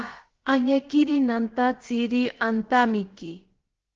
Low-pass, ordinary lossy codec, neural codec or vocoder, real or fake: 7.2 kHz; Opus, 16 kbps; codec, 16 kHz, about 1 kbps, DyCAST, with the encoder's durations; fake